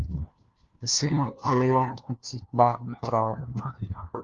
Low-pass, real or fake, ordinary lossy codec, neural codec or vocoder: 7.2 kHz; fake; Opus, 32 kbps; codec, 16 kHz, 1 kbps, FunCodec, trained on LibriTTS, 50 frames a second